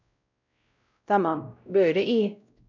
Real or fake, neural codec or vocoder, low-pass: fake; codec, 16 kHz, 0.5 kbps, X-Codec, WavLM features, trained on Multilingual LibriSpeech; 7.2 kHz